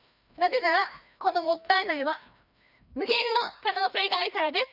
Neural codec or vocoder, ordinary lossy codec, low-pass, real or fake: codec, 16 kHz, 1 kbps, FreqCodec, larger model; none; 5.4 kHz; fake